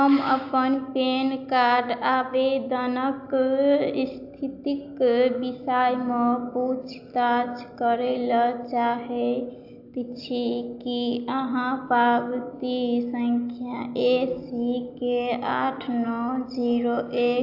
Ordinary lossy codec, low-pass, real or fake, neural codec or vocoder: AAC, 48 kbps; 5.4 kHz; real; none